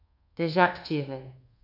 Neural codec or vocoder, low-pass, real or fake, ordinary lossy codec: codec, 24 kHz, 1.2 kbps, DualCodec; 5.4 kHz; fake; AAC, 32 kbps